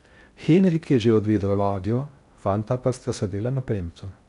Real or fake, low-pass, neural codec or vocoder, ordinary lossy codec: fake; 10.8 kHz; codec, 16 kHz in and 24 kHz out, 0.6 kbps, FocalCodec, streaming, 2048 codes; none